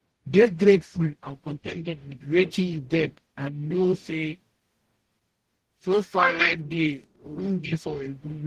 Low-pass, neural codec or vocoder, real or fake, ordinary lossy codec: 14.4 kHz; codec, 44.1 kHz, 0.9 kbps, DAC; fake; Opus, 16 kbps